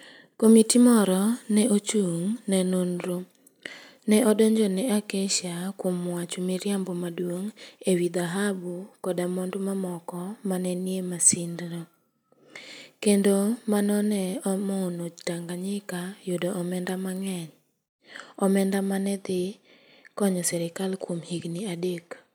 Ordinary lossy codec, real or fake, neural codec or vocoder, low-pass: none; real; none; none